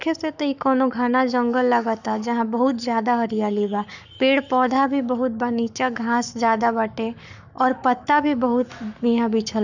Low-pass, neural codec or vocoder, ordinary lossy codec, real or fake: 7.2 kHz; codec, 44.1 kHz, 7.8 kbps, Pupu-Codec; none; fake